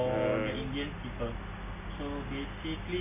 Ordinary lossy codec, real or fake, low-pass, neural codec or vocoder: none; real; 3.6 kHz; none